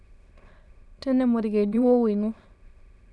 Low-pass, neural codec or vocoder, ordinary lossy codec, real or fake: none; autoencoder, 22.05 kHz, a latent of 192 numbers a frame, VITS, trained on many speakers; none; fake